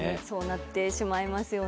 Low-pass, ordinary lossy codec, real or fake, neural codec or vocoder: none; none; real; none